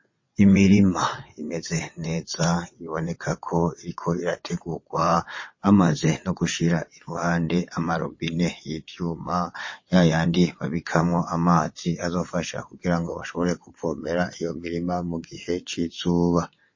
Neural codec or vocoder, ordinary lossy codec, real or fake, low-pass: vocoder, 22.05 kHz, 80 mel bands, WaveNeXt; MP3, 32 kbps; fake; 7.2 kHz